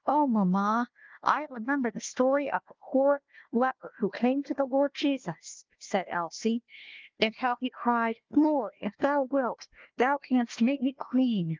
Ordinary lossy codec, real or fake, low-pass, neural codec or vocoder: Opus, 32 kbps; fake; 7.2 kHz; codec, 16 kHz, 1 kbps, FunCodec, trained on Chinese and English, 50 frames a second